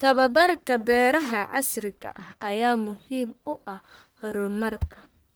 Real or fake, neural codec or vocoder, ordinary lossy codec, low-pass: fake; codec, 44.1 kHz, 1.7 kbps, Pupu-Codec; none; none